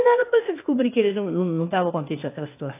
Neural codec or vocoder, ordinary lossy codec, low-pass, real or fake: codec, 16 kHz, 0.8 kbps, ZipCodec; AAC, 24 kbps; 3.6 kHz; fake